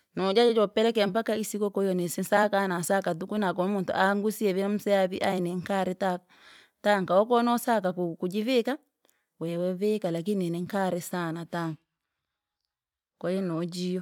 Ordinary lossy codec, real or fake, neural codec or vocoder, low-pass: none; fake; vocoder, 44.1 kHz, 128 mel bands every 256 samples, BigVGAN v2; 19.8 kHz